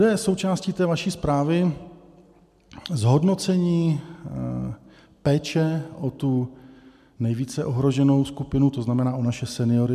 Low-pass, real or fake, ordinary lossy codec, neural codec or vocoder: 14.4 kHz; real; MP3, 96 kbps; none